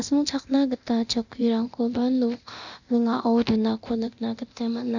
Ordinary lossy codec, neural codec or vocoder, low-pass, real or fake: none; codec, 24 kHz, 0.9 kbps, DualCodec; 7.2 kHz; fake